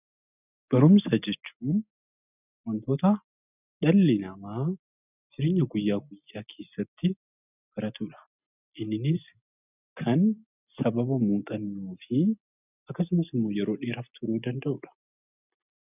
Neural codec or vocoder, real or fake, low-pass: none; real; 3.6 kHz